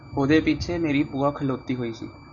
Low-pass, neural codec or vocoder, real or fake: 7.2 kHz; none; real